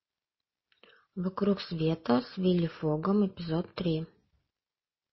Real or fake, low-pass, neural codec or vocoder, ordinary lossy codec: real; 7.2 kHz; none; MP3, 24 kbps